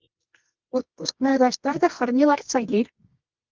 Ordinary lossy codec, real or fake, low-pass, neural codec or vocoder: Opus, 32 kbps; fake; 7.2 kHz; codec, 24 kHz, 0.9 kbps, WavTokenizer, medium music audio release